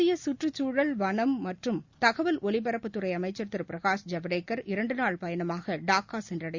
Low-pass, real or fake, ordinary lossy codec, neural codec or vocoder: 7.2 kHz; real; Opus, 64 kbps; none